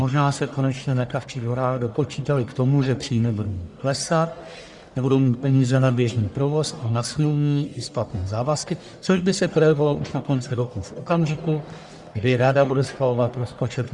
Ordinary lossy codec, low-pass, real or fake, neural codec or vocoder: Opus, 64 kbps; 10.8 kHz; fake; codec, 44.1 kHz, 1.7 kbps, Pupu-Codec